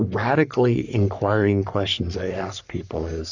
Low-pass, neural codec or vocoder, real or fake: 7.2 kHz; codec, 44.1 kHz, 3.4 kbps, Pupu-Codec; fake